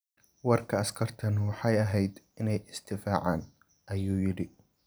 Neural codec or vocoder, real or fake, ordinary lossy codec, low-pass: none; real; none; none